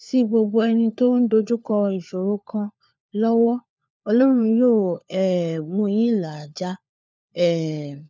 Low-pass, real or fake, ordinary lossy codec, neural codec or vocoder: none; fake; none; codec, 16 kHz, 4 kbps, FunCodec, trained on LibriTTS, 50 frames a second